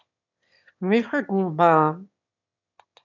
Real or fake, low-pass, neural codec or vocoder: fake; 7.2 kHz; autoencoder, 22.05 kHz, a latent of 192 numbers a frame, VITS, trained on one speaker